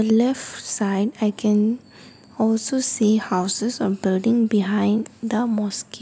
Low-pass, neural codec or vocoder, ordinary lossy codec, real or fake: none; none; none; real